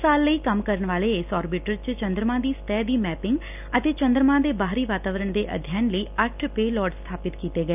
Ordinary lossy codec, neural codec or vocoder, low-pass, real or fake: none; none; 3.6 kHz; real